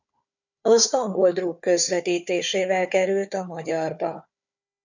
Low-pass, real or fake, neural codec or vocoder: 7.2 kHz; fake; codec, 16 kHz, 4 kbps, FunCodec, trained on Chinese and English, 50 frames a second